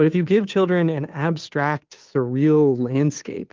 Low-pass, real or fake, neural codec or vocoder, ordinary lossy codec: 7.2 kHz; fake; codec, 16 kHz, 2 kbps, FunCodec, trained on Chinese and English, 25 frames a second; Opus, 32 kbps